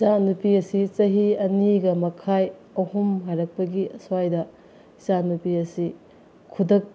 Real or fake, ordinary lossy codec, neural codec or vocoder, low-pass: real; none; none; none